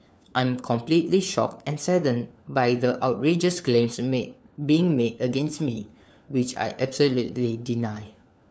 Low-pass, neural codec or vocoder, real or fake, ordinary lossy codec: none; codec, 16 kHz, 4 kbps, FunCodec, trained on LibriTTS, 50 frames a second; fake; none